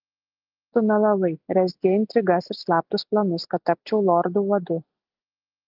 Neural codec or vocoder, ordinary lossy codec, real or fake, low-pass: none; Opus, 32 kbps; real; 5.4 kHz